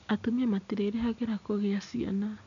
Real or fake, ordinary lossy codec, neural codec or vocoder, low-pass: real; none; none; 7.2 kHz